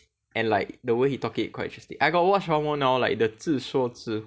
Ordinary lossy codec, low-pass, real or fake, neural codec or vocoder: none; none; real; none